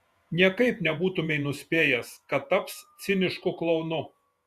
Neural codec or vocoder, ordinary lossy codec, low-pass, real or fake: vocoder, 48 kHz, 128 mel bands, Vocos; Opus, 64 kbps; 14.4 kHz; fake